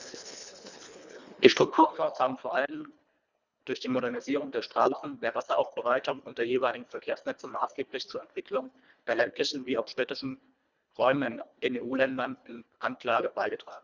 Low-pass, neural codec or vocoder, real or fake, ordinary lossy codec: 7.2 kHz; codec, 24 kHz, 1.5 kbps, HILCodec; fake; Opus, 64 kbps